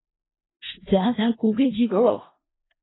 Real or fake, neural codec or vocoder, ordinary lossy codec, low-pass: fake; codec, 16 kHz in and 24 kHz out, 0.4 kbps, LongCat-Audio-Codec, four codebook decoder; AAC, 16 kbps; 7.2 kHz